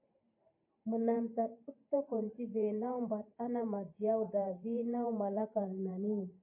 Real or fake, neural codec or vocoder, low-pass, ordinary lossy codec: fake; vocoder, 44.1 kHz, 128 mel bands every 512 samples, BigVGAN v2; 3.6 kHz; MP3, 24 kbps